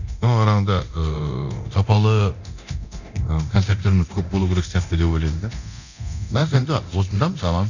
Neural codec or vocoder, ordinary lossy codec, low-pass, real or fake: codec, 24 kHz, 0.9 kbps, DualCodec; none; 7.2 kHz; fake